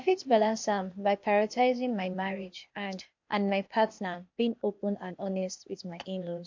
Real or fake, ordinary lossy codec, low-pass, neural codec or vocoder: fake; MP3, 48 kbps; 7.2 kHz; codec, 16 kHz, 0.8 kbps, ZipCodec